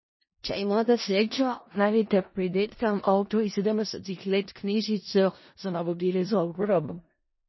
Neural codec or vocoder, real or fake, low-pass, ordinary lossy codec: codec, 16 kHz in and 24 kHz out, 0.4 kbps, LongCat-Audio-Codec, four codebook decoder; fake; 7.2 kHz; MP3, 24 kbps